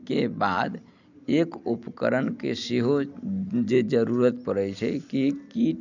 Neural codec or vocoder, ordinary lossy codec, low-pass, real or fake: none; none; 7.2 kHz; real